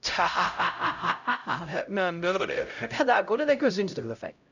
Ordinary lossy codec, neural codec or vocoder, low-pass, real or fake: none; codec, 16 kHz, 0.5 kbps, X-Codec, HuBERT features, trained on LibriSpeech; 7.2 kHz; fake